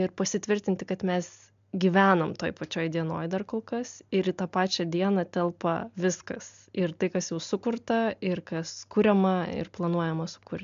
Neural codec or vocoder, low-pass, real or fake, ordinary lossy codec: none; 7.2 kHz; real; MP3, 64 kbps